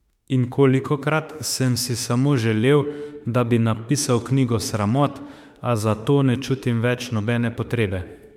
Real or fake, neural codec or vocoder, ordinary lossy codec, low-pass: fake; autoencoder, 48 kHz, 32 numbers a frame, DAC-VAE, trained on Japanese speech; MP3, 96 kbps; 19.8 kHz